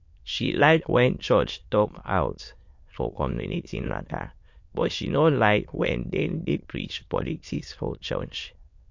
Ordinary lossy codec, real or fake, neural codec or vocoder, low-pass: MP3, 48 kbps; fake; autoencoder, 22.05 kHz, a latent of 192 numbers a frame, VITS, trained on many speakers; 7.2 kHz